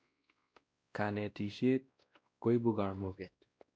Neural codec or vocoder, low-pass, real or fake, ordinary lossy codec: codec, 16 kHz, 1 kbps, X-Codec, WavLM features, trained on Multilingual LibriSpeech; none; fake; none